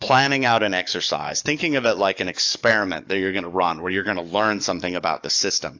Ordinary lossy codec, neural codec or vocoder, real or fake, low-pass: AAC, 48 kbps; codec, 44.1 kHz, 7.8 kbps, DAC; fake; 7.2 kHz